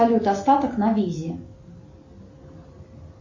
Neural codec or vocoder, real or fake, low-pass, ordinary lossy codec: none; real; 7.2 kHz; MP3, 32 kbps